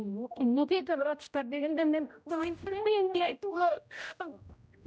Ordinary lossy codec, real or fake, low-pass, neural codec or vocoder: none; fake; none; codec, 16 kHz, 0.5 kbps, X-Codec, HuBERT features, trained on general audio